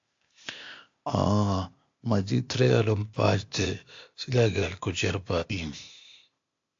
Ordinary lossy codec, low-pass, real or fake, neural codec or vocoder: AAC, 48 kbps; 7.2 kHz; fake; codec, 16 kHz, 0.8 kbps, ZipCodec